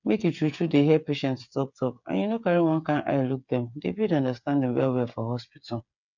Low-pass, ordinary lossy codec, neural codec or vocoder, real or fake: 7.2 kHz; none; codec, 16 kHz, 8 kbps, FreqCodec, smaller model; fake